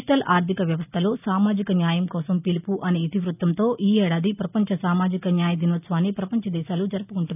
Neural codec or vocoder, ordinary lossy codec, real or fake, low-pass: none; none; real; 3.6 kHz